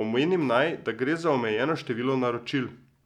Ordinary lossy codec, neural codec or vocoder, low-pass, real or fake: none; none; 19.8 kHz; real